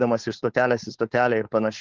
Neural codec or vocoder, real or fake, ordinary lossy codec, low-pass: codec, 16 kHz, 4.8 kbps, FACodec; fake; Opus, 16 kbps; 7.2 kHz